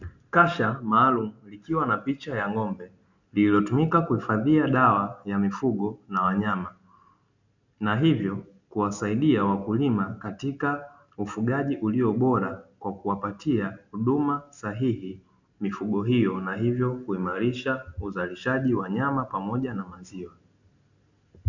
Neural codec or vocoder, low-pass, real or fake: none; 7.2 kHz; real